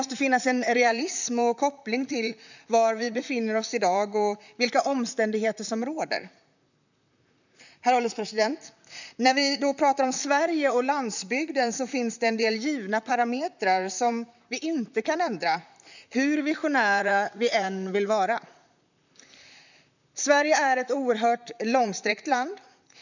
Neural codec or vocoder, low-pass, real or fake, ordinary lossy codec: vocoder, 44.1 kHz, 128 mel bands, Pupu-Vocoder; 7.2 kHz; fake; none